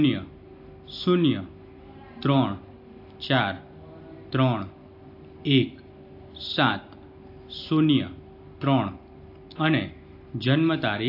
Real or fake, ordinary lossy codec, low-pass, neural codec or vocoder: real; AAC, 32 kbps; 5.4 kHz; none